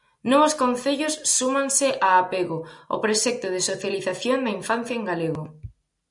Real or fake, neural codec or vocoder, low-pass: real; none; 10.8 kHz